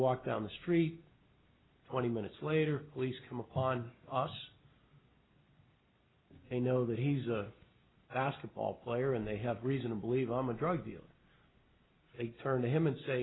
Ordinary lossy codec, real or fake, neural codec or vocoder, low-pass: AAC, 16 kbps; real; none; 7.2 kHz